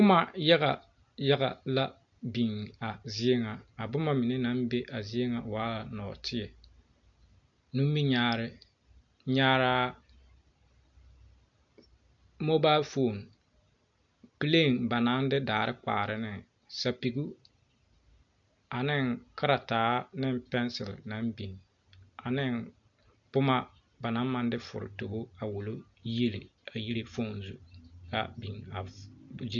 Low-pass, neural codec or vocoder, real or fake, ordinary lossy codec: 7.2 kHz; none; real; AAC, 64 kbps